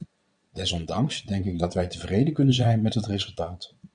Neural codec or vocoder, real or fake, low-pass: vocoder, 22.05 kHz, 80 mel bands, Vocos; fake; 9.9 kHz